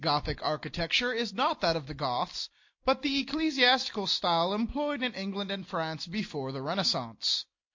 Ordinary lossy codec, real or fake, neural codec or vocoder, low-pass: MP3, 48 kbps; real; none; 7.2 kHz